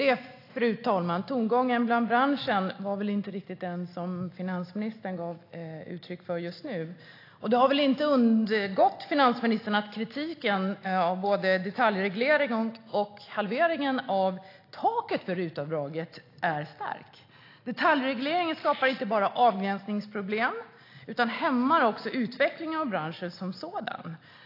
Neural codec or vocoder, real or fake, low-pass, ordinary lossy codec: none; real; 5.4 kHz; AAC, 32 kbps